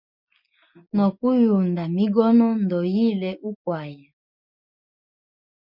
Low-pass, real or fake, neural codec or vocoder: 5.4 kHz; real; none